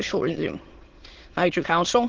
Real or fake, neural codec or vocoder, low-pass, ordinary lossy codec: fake; autoencoder, 22.05 kHz, a latent of 192 numbers a frame, VITS, trained on many speakers; 7.2 kHz; Opus, 16 kbps